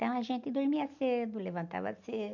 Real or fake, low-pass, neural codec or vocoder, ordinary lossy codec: real; 7.2 kHz; none; none